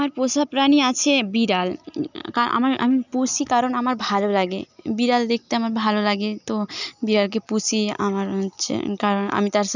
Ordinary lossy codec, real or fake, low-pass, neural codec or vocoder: none; real; 7.2 kHz; none